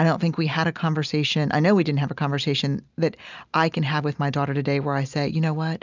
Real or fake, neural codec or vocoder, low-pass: real; none; 7.2 kHz